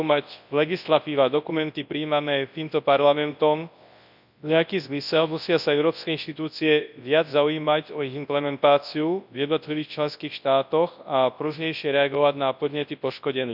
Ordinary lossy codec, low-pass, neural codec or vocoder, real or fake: none; 5.4 kHz; codec, 24 kHz, 0.9 kbps, WavTokenizer, large speech release; fake